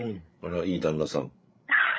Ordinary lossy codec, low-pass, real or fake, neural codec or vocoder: none; none; fake; codec, 16 kHz, 16 kbps, FreqCodec, larger model